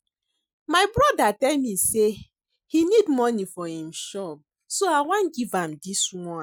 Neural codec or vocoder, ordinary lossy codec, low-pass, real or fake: none; none; none; real